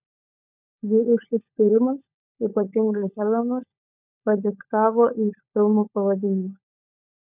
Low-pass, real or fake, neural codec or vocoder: 3.6 kHz; fake; codec, 16 kHz, 16 kbps, FunCodec, trained on LibriTTS, 50 frames a second